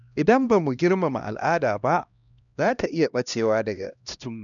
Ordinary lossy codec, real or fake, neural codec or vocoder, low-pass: none; fake; codec, 16 kHz, 1 kbps, X-Codec, HuBERT features, trained on LibriSpeech; 7.2 kHz